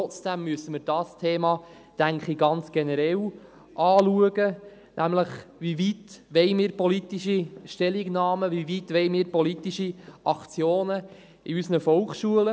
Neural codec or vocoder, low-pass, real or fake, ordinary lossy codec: none; none; real; none